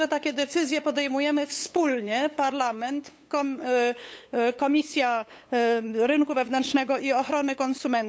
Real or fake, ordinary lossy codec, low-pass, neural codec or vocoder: fake; none; none; codec, 16 kHz, 8 kbps, FunCodec, trained on LibriTTS, 25 frames a second